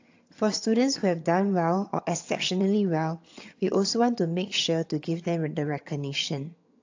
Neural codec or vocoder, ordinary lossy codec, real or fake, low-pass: vocoder, 22.05 kHz, 80 mel bands, HiFi-GAN; AAC, 48 kbps; fake; 7.2 kHz